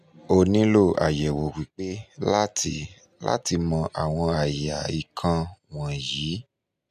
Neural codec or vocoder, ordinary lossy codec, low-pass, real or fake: none; none; 14.4 kHz; real